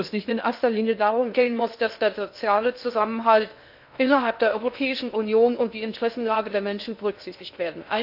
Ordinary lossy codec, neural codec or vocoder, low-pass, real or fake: AAC, 48 kbps; codec, 16 kHz in and 24 kHz out, 0.6 kbps, FocalCodec, streaming, 2048 codes; 5.4 kHz; fake